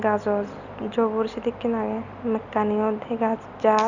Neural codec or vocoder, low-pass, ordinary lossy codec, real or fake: none; 7.2 kHz; none; real